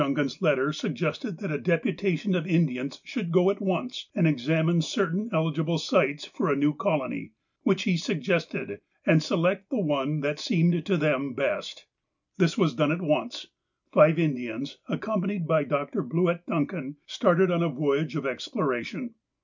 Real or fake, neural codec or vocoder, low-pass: real; none; 7.2 kHz